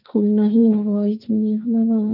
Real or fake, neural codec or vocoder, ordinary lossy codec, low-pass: fake; codec, 16 kHz, 1.1 kbps, Voila-Tokenizer; none; 5.4 kHz